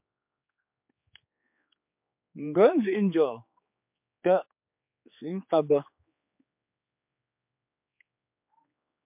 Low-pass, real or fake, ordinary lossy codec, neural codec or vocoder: 3.6 kHz; fake; MP3, 32 kbps; codec, 16 kHz, 4 kbps, X-Codec, HuBERT features, trained on general audio